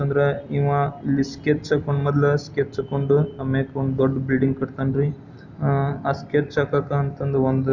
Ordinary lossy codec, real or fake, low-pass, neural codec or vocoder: none; real; 7.2 kHz; none